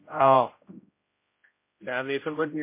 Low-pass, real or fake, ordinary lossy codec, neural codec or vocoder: 3.6 kHz; fake; MP3, 24 kbps; codec, 16 kHz, 0.5 kbps, X-Codec, HuBERT features, trained on general audio